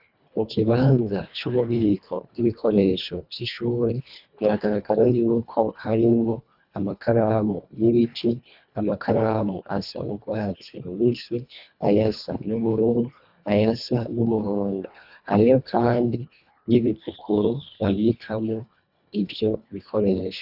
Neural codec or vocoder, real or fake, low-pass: codec, 24 kHz, 1.5 kbps, HILCodec; fake; 5.4 kHz